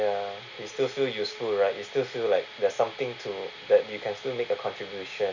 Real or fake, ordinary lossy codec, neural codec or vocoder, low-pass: real; none; none; 7.2 kHz